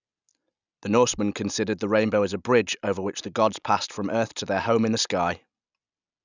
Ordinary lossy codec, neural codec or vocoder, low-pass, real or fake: none; none; 7.2 kHz; real